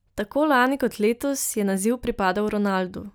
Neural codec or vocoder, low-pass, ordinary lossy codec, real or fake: none; none; none; real